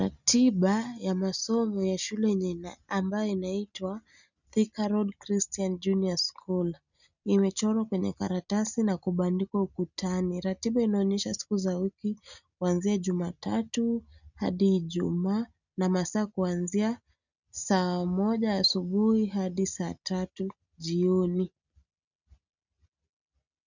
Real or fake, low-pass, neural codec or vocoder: real; 7.2 kHz; none